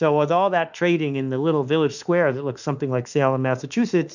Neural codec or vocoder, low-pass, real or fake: autoencoder, 48 kHz, 32 numbers a frame, DAC-VAE, trained on Japanese speech; 7.2 kHz; fake